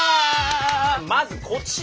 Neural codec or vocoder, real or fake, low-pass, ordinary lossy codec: none; real; none; none